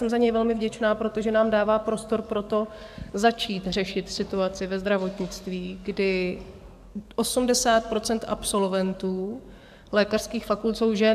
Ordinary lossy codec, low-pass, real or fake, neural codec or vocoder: MP3, 96 kbps; 14.4 kHz; fake; codec, 44.1 kHz, 7.8 kbps, DAC